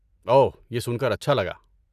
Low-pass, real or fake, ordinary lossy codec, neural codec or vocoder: 14.4 kHz; real; none; none